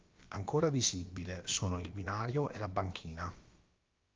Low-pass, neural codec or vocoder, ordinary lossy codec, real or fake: 7.2 kHz; codec, 16 kHz, about 1 kbps, DyCAST, with the encoder's durations; Opus, 24 kbps; fake